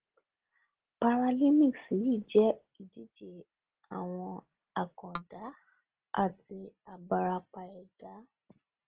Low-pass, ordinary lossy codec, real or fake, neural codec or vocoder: 3.6 kHz; Opus, 16 kbps; real; none